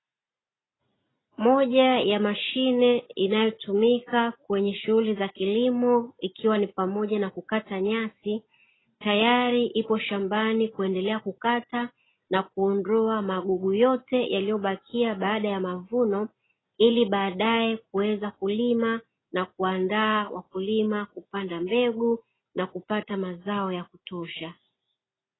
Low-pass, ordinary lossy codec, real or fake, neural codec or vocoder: 7.2 kHz; AAC, 16 kbps; real; none